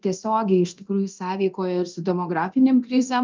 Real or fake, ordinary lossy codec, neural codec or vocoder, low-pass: fake; Opus, 24 kbps; codec, 24 kHz, 0.9 kbps, DualCodec; 7.2 kHz